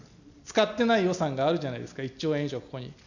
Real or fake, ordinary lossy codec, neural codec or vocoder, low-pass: real; none; none; 7.2 kHz